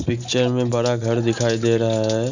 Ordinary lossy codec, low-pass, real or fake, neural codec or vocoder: none; 7.2 kHz; real; none